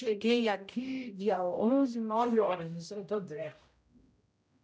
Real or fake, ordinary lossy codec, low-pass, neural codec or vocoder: fake; none; none; codec, 16 kHz, 0.5 kbps, X-Codec, HuBERT features, trained on general audio